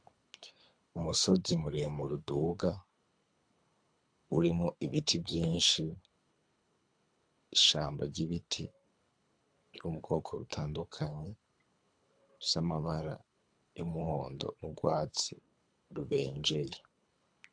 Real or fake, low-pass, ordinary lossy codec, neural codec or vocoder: fake; 9.9 kHz; AAC, 64 kbps; codec, 24 kHz, 3 kbps, HILCodec